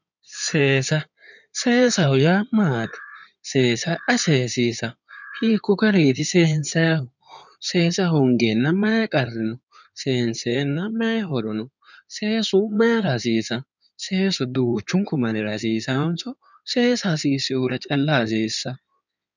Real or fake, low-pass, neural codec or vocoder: fake; 7.2 kHz; codec, 16 kHz in and 24 kHz out, 2.2 kbps, FireRedTTS-2 codec